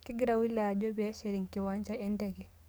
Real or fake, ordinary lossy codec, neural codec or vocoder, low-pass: fake; none; codec, 44.1 kHz, 7.8 kbps, DAC; none